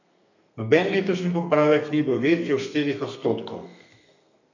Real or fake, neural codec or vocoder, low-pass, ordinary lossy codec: fake; codec, 32 kHz, 1.9 kbps, SNAC; 7.2 kHz; none